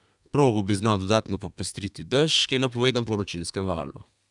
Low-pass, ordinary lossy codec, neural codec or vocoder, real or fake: 10.8 kHz; none; codec, 32 kHz, 1.9 kbps, SNAC; fake